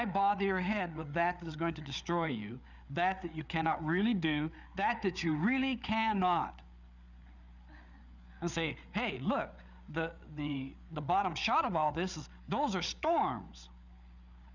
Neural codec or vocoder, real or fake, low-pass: codec, 16 kHz, 8 kbps, FreqCodec, larger model; fake; 7.2 kHz